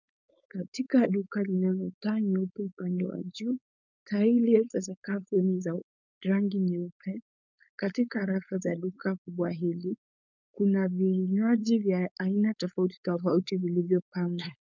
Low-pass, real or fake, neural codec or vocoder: 7.2 kHz; fake; codec, 16 kHz, 4.8 kbps, FACodec